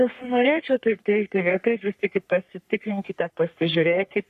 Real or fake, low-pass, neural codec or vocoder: fake; 14.4 kHz; codec, 44.1 kHz, 3.4 kbps, Pupu-Codec